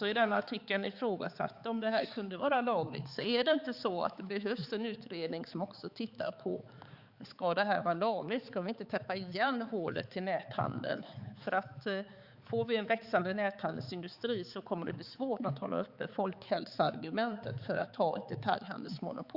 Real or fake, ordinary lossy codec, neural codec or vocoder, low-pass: fake; Opus, 64 kbps; codec, 16 kHz, 4 kbps, X-Codec, HuBERT features, trained on balanced general audio; 5.4 kHz